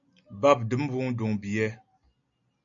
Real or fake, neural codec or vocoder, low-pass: real; none; 7.2 kHz